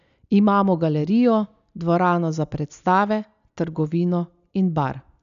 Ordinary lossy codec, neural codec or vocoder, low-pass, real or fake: none; none; 7.2 kHz; real